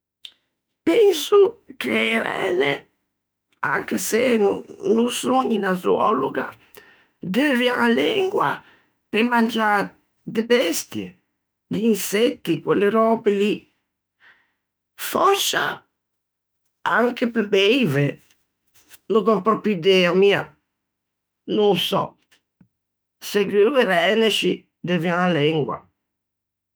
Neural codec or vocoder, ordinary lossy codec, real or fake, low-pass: autoencoder, 48 kHz, 32 numbers a frame, DAC-VAE, trained on Japanese speech; none; fake; none